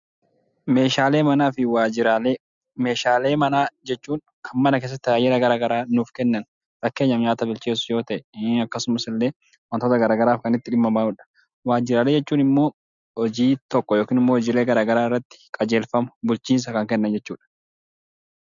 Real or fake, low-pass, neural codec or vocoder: real; 7.2 kHz; none